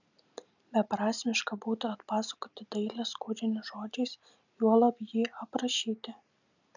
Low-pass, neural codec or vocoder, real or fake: 7.2 kHz; none; real